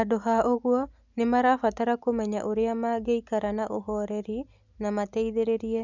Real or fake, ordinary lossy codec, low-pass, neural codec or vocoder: real; none; 7.2 kHz; none